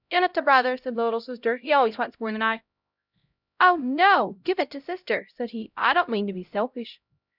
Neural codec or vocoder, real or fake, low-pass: codec, 16 kHz, 0.5 kbps, X-Codec, HuBERT features, trained on LibriSpeech; fake; 5.4 kHz